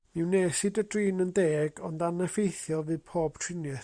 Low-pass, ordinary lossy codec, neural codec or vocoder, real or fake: 9.9 kHz; Opus, 64 kbps; vocoder, 44.1 kHz, 128 mel bands every 512 samples, BigVGAN v2; fake